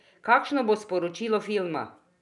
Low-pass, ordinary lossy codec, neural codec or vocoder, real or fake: 10.8 kHz; none; none; real